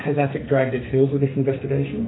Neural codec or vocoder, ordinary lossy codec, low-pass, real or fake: codec, 44.1 kHz, 2.6 kbps, SNAC; AAC, 16 kbps; 7.2 kHz; fake